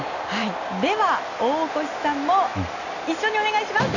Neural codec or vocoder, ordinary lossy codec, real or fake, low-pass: none; none; real; 7.2 kHz